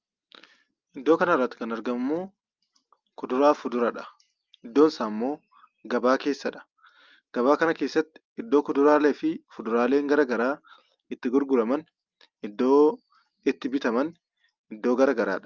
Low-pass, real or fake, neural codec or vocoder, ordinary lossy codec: 7.2 kHz; real; none; Opus, 24 kbps